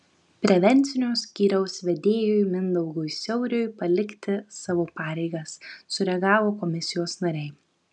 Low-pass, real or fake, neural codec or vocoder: 10.8 kHz; real; none